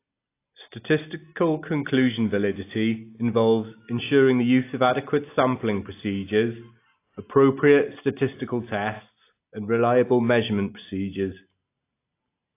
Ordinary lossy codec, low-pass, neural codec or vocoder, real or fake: AAC, 24 kbps; 3.6 kHz; none; real